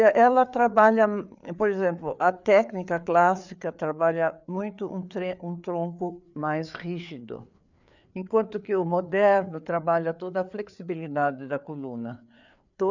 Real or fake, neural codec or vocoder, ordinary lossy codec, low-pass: fake; codec, 16 kHz, 4 kbps, FreqCodec, larger model; none; 7.2 kHz